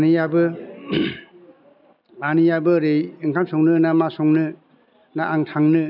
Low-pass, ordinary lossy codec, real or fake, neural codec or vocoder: 5.4 kHz; none; real; none